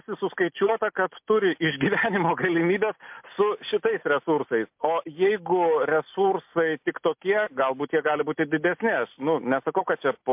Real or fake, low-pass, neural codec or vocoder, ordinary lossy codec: real; 3.6 kHz; none; MP3, 32 kbps